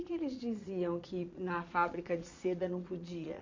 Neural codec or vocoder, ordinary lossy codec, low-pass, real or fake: vocoder, 22.05 kHz, 80 mel bands, Vocos; MP3, 48 kbps; 7.2 kHz; fake